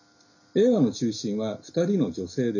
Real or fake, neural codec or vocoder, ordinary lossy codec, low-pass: real; none; none; 7.2 kHz